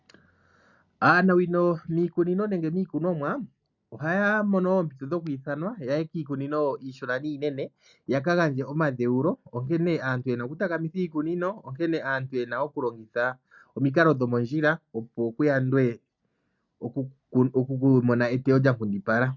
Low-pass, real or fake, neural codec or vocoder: 7.2 kHz; real; none